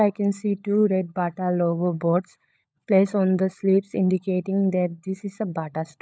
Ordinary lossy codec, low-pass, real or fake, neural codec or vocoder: none; none; fake; codec, 16 kHz, 16 kbps, FunCodec, trained on LibriTTS, 50 frames a second